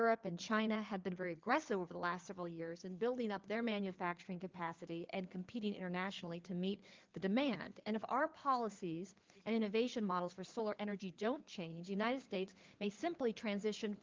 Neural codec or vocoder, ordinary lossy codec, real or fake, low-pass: codec, 16 kHz in and 24 kHz out, 2.2 kbps, FireRedTTS-2 codec; Opus, 24 kbps; fake; 7.2 kHz